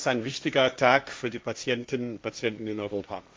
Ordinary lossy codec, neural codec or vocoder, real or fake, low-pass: none; codec, 16 kHz, 1.1 kbps, Voila-Tokenizer; fake; none